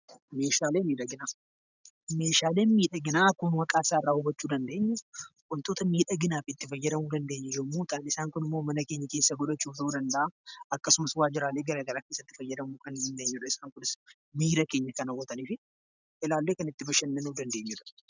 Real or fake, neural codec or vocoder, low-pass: real; none; 7.2 kHz